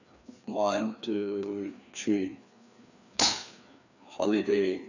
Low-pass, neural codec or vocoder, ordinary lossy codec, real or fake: 7.2 kHz; codec, 16 kHz, 2 kbps, FreqCodec, larger model; none; fake